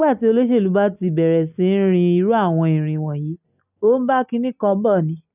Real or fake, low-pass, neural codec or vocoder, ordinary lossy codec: real; 3.6 kHz; none; none